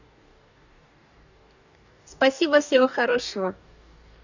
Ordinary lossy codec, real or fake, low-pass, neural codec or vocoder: none; fake; 7.2 kHz; codec, 44.1 kHz, 2.6 kbps, DAC